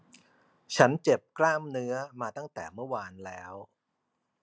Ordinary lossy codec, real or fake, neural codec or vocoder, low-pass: none; real; none; none